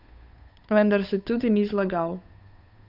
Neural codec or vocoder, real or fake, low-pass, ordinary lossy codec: codec, 16 kHz, 8 kbps, FunCodec, trained on Chinese and English, 25 frames a second; fake; 5.4 kHz; none